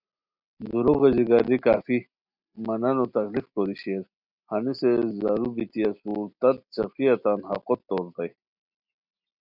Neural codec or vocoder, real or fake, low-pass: none; real; 5.4 kHz